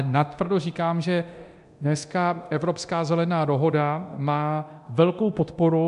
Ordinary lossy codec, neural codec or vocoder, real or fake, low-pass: MP3, 96 kbps; codec, 24 kHz, 0.9 kbps, DualCodec; fake; 10.8 kHz